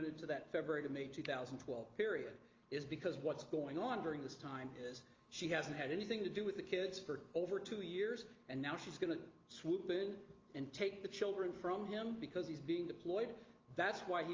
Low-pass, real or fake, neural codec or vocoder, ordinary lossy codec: 7.2 kHz; real; none; Opus, 32 kbps